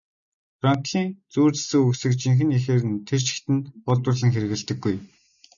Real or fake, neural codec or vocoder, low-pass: real; none; 7.2 kHz